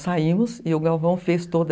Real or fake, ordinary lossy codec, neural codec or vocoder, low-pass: real; none; none; none